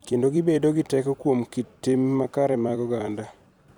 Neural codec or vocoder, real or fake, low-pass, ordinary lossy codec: vocoder, 44.1 kHz, 128 mel bands every 256 samples, BigVGAN v2; fake; 19.8 kHz; none